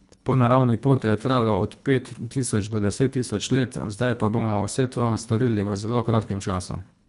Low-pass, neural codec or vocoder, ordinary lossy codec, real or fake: 10.8 kHz; codec, 24 kHz, 1.5 kbps, HILCodec; none; fake